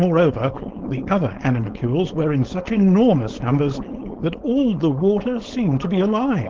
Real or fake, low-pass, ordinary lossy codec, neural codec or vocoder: fake; 7.2 kHz; Opus, 16 kbps; codec, 16 kHz, 4.8 kbps, FACodec